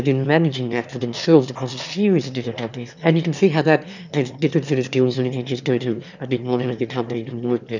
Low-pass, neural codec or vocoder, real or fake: 7.2 kHz; autoencoder, 22.05 kHz, a latent of 192 numbers a frame, VITS, trained on one speaker; fake